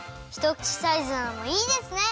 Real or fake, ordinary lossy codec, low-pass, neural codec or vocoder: real; none; none; none